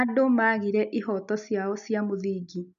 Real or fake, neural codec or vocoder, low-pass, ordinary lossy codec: real; none; 7.2 kHz; none